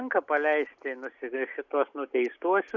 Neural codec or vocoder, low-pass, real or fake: none; 7.2 kHz; real